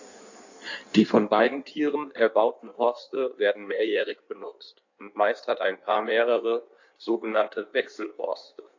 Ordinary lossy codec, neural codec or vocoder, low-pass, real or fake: AAC, 48 kbps; codec, 16 kHz in and 24 kHz out, 1.1 kbps, FireRedTTS-2 codec; 7.2 kHz; fake